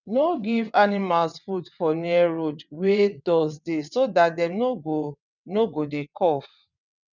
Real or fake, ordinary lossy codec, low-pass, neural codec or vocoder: fake; none; 7.2 kHz; vocoder, 22.05 kHz, 80 mel bands, WaveNeXt